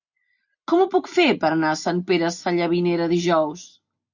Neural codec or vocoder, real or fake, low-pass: none; real; 7.2 kHz